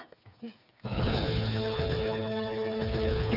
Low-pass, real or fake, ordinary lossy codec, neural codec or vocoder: 5.4 kHz; fake; none; codec, 16 kHz, 4 kbps, FreqCodec, smaller model